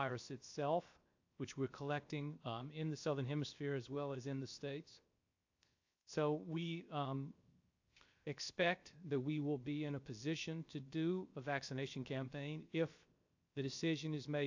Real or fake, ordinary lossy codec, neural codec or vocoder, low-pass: fake; AAC, 48 kbps; codec, 16 kHz, 0.7 kbps, FocalCodec; 7.2 kHz